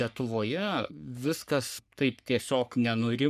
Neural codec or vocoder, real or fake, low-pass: codec, 44.1 kHz, 3.4 kbps, Pupu-Codec; fake; 14.4 kHz